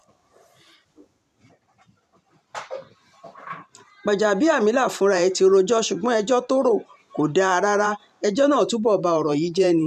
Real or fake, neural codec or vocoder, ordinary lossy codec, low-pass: fake; vocoder, 44.1 kHz, 128 mel bands every 512 samples, BigVGAN v2; none; 14.4 kHz